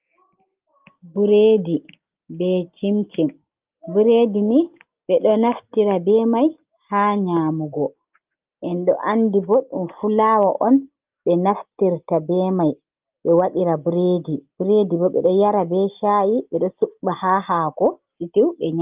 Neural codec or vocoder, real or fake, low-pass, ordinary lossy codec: none; real; 3.6 kHz; Opus, 32 kbps